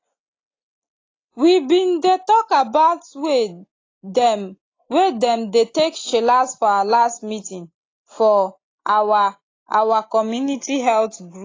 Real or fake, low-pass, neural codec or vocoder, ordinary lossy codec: real; 7.2 kHz; none; AAC, 32 kbps